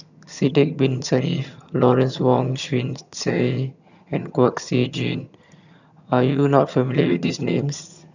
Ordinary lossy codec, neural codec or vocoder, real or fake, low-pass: none; vocoder, 22.05 kHz, 80 mel bands, HiFi-GAN; fake; 7.2 kHz